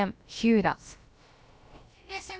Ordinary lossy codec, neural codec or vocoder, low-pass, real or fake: none; codec, 16 kHz, about 1 kbps, DyCAST, with the encoder's durations; none; fake